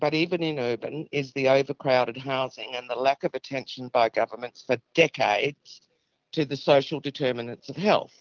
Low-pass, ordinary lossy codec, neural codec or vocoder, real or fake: 7.2 kHz; Opus, 32 kbps; none; real